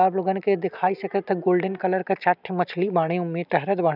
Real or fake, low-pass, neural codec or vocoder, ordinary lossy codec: real; 5.4 kHz; none; none